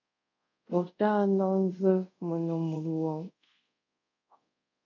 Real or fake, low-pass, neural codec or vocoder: fake; 7.2 kHz; codec, 24 kHz, 0.5 kbps, DualCodec